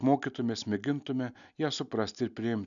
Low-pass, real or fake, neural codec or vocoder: 7.2 kHz; real; none